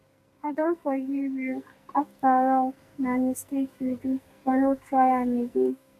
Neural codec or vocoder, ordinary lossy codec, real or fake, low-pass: codec, 44.1 kHz, 2.6 kbps, SNAC; none; fake; 14.4 kHz